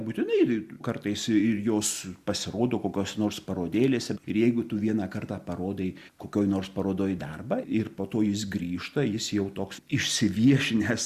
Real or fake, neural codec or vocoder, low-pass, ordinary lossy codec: real; none; 14.4 kHz; Opus, 64 kbps